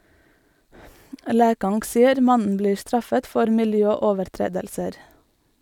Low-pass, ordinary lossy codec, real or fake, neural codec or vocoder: 19.8 kHz; none; real; none